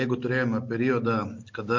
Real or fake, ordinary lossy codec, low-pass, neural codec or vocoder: real; MP3, 48 kbps; 7.2 kHz; none